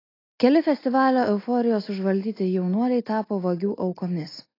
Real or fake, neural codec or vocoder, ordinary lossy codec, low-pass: real; none; AAC, 24 kbps; 5.4 kHz